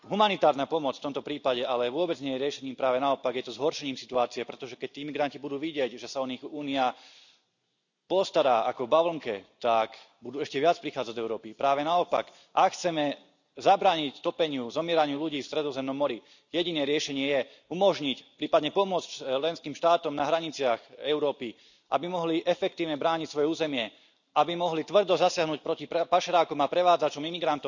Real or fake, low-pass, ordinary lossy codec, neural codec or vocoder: real; 7.2 kHz; none; none